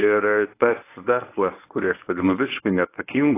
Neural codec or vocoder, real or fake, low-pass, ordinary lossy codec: codec, 24 kHz, 0.9 kbps, WavTokenizer, medium speech release version 1; fake; 3.6 kHz; AAC, 24 kbps